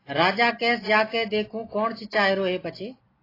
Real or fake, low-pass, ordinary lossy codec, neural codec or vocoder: real; 5.4 kHz; AAC, 24 kbps; none